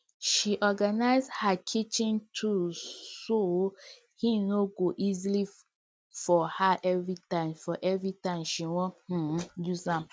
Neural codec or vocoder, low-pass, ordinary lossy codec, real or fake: none; none; none; real